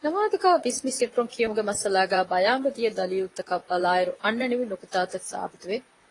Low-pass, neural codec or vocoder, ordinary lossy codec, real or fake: 10.8 kHz; vocoder, 44.1 kHz, 128 mel bands, Pupu-Vocoder; AAC, 32 kbps; fake